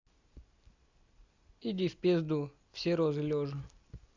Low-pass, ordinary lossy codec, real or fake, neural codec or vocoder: 7.2 kHz; Opus, 64 kbps; real; none